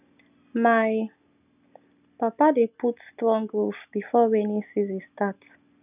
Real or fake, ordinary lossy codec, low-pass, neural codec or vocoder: real; none; 3.6 kHz; none